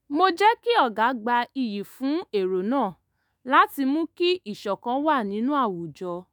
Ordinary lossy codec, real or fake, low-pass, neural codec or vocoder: none; fake; none; autoencoder, 48 kHz, 128 numbers a frame, DAC-VAE, trained on Japanese speech